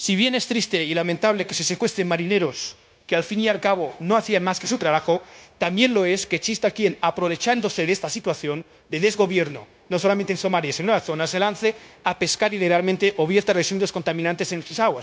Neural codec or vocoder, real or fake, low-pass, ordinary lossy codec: codec, 16 kHz, 0.9 kbps, LongCat-Audio-Codec; fake; none; none